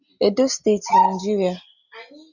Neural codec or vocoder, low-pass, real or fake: none; 7.2 kHz; real